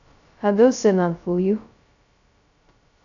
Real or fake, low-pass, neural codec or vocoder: fake; 7.2 kHz; codec, 16 kHz, 0.2 kbps, FocalCodec